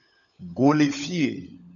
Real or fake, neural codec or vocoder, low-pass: fake; codec, 16 kHz, 16 kbps, FunCodec, trained on LibriTTS, 50 frames a second; 7.2 kHz